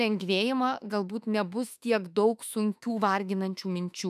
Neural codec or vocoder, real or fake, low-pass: autoencoder, 48 kHz, 32 numbers a frame, DAC-VAE, trained on Japanese speech; fake; 14.4 kHz